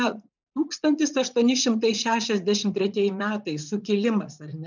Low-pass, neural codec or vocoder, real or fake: 7.2 kHz; vocoder, 44.1 kHz, 80 mel bands, Vocos; fake